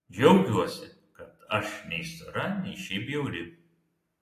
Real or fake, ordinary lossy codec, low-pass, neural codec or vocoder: real; AAC, 48 kbps; 14.4 kHz; none